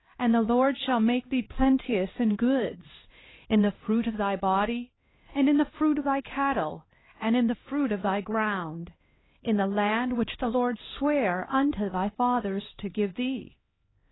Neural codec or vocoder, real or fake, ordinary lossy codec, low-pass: codec, 16 kHz, 1 kbps, X-Codec, HuBERT features, trained on LibriSpeech; fake; AAC, 16 kbps; 7.2 kHz